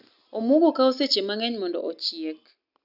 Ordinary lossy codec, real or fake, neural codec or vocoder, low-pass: none; real; none; 5.4 kHz